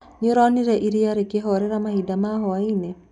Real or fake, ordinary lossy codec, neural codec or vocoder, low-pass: real; none; none; 10.8 kHz